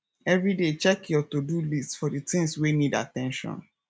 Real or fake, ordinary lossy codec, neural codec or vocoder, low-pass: real; none; none; none